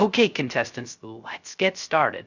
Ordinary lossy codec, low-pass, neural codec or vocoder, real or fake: Opus, 64 kbps; 7.2 kHz; codec, 16 kHz, 0.3 kbps, FocalCodec; fake